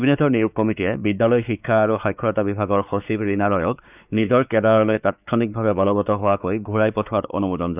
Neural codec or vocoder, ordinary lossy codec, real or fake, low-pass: codec, 16 kHz, 4 kbps, X-Codec, WavLM features, trained on Multilingual LibriSpeech; none; fake; 3.6 kHz